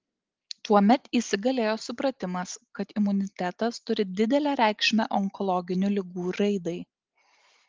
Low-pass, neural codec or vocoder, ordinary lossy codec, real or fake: 7.2 kHz; none; Opus, 32 kbps; real